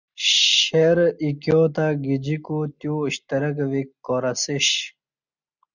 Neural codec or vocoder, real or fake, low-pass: none; real; 7.2 kHz